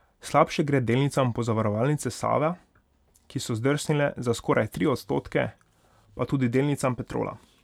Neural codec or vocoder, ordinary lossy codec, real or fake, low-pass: none; none; real; 19.8 kHz